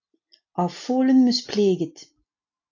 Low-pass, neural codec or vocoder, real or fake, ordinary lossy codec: 7.2 kHz; none; real; AAC, 48 kbps